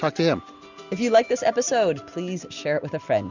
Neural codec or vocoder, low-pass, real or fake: none; 7.2 kHz; real